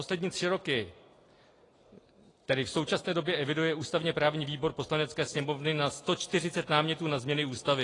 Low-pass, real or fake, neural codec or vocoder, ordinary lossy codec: 10.8 kHz; real; none; AAC, 32 kbps